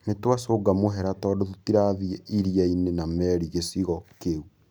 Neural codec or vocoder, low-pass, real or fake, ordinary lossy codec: none; none; real; none